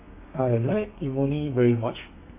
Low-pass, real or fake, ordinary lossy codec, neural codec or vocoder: 3.6 kHz; fake; MP3, 24 kbps; codec, 32 kHz, 1.9 kbps, SNAC